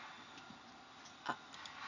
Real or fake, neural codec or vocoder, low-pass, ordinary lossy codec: real; none; 7.2 kHz; none